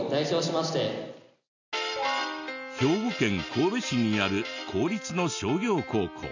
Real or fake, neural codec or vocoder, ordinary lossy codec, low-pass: real; none; none; 7.2 kHz